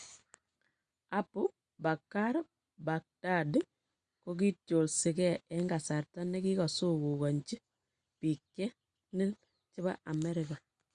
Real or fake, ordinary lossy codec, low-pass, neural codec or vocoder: real; Opus, 64 kbps; 9.9 kHz; none